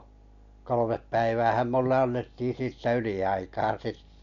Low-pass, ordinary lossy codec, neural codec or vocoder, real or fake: 7.2 kHz; none; none; real